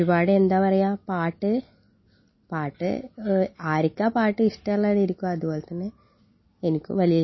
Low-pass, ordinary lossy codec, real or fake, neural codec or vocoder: 7.2 kHz; MP3, 24 kbps; real; none